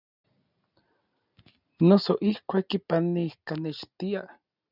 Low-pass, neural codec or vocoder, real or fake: 5.4 kHz; none; real